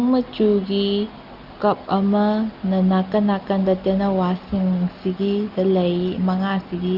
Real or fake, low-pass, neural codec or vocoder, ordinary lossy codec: real; 5.4 kHz; none; Opus, 24 kbps